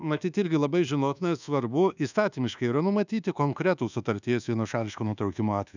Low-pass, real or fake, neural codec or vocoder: 7.2 kHz; fake; codec, 24 kHz, 1.2 kbps, DualCodec